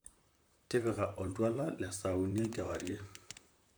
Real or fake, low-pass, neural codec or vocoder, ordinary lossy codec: fake; none; vocoder, 44.1 kHz, 128 mel bands, Pupu-Vocoder; none